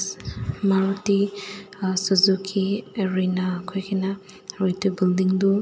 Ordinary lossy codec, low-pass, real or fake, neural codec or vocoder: none; none; real; none